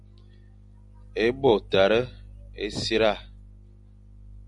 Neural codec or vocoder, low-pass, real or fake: none; 10.8 kHz; real